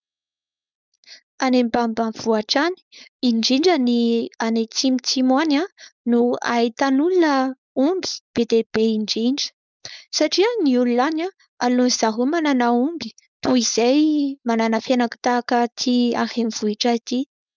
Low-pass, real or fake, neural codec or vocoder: 7.2 kHz; fake; codec, 16 kHz, 4.8 kbps, FACodec